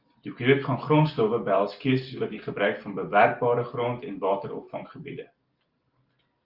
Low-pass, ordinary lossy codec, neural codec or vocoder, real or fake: 5.4 kHz; Opus, 32 kbps; none; real